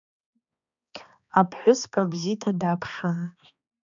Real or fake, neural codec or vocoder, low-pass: fake; codec, 16 kHz, 2 kbps, X-Codec, HuBERT features, trained on balanced general audio; 7.2 kHz